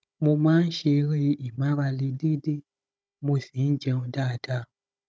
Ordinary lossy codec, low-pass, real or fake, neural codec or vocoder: none; none; fake; codec, 16 kHz, 16 kbps, FunCodec, trained on Chinese and English, 50 frames a second